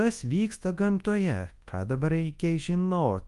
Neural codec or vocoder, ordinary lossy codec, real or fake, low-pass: codec, 24 kHz, 0.9 kbps, WavTokenizer, large speech release; Opus, 32 kbps; fake; 10.8 kHz